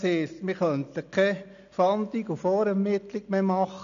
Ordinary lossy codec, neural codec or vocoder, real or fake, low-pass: none; none; real; 7.2 kHz